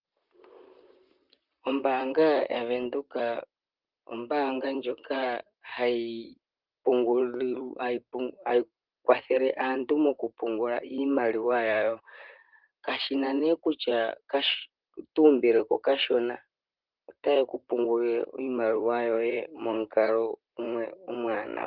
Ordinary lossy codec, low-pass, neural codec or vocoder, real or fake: Opus, 32 kbps; 5.4 kHz; vocoder, 44.1 kHz, 128 mel bands, Pupu-Vocoder; fake